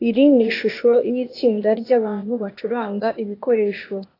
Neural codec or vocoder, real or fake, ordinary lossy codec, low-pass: codec, 16 kHz, 0.8 kbps, ZipCodec; fake; AAC, 32 kbps; 5.4 kHz